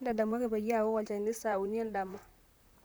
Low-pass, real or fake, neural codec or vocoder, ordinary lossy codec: none; fake; vocoder, 44.1 kHz, 128 mel bands, Pupu-Vocoder; none